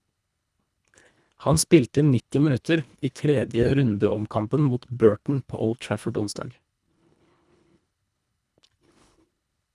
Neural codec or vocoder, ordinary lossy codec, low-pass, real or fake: codec, 24 kHz, 1.5 kbps, HILCodec; none; none; fake